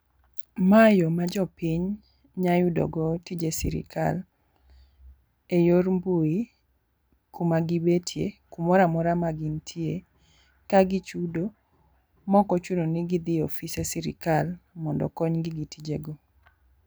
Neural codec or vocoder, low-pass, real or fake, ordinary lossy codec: none; none; real; none